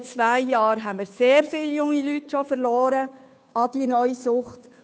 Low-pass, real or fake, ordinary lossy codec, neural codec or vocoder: none; fake; none; codec, 16 kHz, 2 kbps, FunCodec, trained on Chinese and English, 25 frames a second